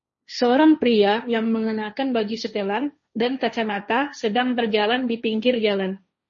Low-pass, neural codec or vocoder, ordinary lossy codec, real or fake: 7.2 kHz; codec, 16 kHz, 1.1 kbps, Voila-Tokenizer; MP3, 32 kbps; fake